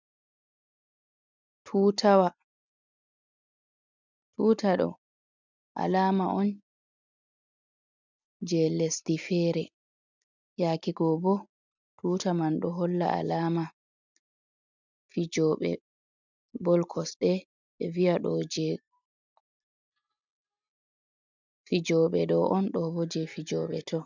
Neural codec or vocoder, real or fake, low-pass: none; real; 7.2 kHz